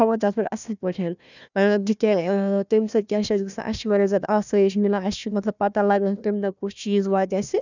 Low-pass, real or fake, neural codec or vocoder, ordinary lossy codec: 7.2 kHz; fake; codec, 16 kHz, 1 kbps, FunCodec, trained on Chinese and English, 50 frames a second; none